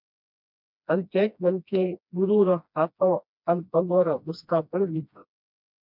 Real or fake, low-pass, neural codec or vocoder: fake; 5.4 kHz; codec, 16 kHz, 1 kbps, FreqCodec, smaller model